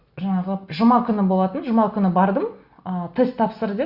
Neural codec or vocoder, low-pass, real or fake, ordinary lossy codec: none; 5.4 kHz; real; Opus, 64 kbps